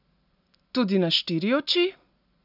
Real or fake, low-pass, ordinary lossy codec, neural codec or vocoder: real; 5.4 kHz; AAC, 48 kbps; none